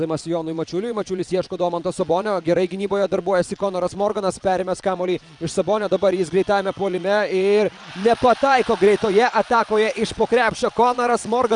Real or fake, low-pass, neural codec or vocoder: fake; 9.9 kHz; vocoder, 22.05 kHz, 80 mel bands, Vocos